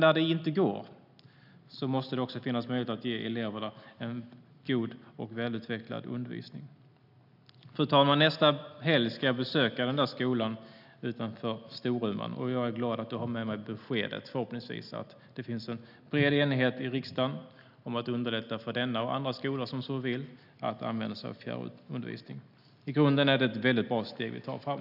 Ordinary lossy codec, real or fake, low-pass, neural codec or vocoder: none; real; 5.4 kHz; none